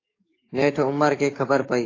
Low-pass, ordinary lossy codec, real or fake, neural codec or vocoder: 7.2 kHz; AAC, 32 kbps; fake; codec, 44.1 kHz, 7.8 kbps, DAC